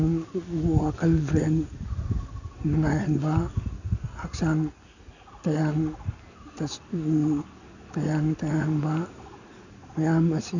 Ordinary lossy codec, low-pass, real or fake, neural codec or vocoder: none; 7.2 kHz; real; none